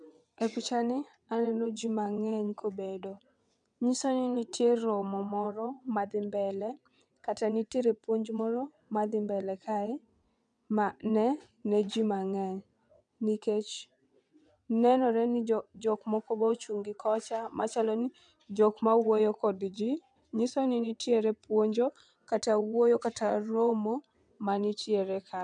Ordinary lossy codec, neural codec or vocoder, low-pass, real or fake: none; vocoder, 22.05 kHz, 80 mel bands, WaveNeXt; 9.9 kHz; fake